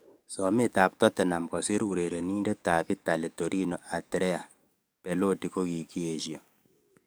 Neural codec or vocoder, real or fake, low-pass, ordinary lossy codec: codec, 44.1 kHz, 7.8 kbps, DAC; fake; none; none